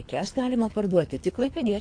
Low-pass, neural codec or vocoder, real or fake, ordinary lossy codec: 9.9 kHz; codec, 24 kHz, 3 kbps, HILCodec; fake; AAC, 48 kbps